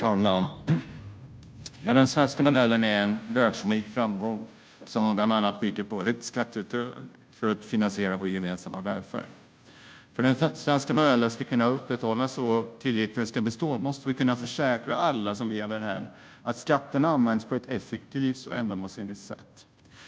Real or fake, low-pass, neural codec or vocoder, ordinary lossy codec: fake; none; codec, 16 kHz, 0.5 kbps, FunCodec, trained on Chinese and English, 25 frames a second; none